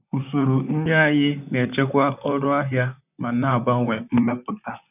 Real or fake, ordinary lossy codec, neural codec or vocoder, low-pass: fake; none; vocoder, 44.1 kHz, 128 mel bands, Pupu-Vocoder; 3.6 kHz